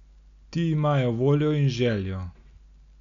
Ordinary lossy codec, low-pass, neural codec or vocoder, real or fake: none; 7.2 kHz; none; real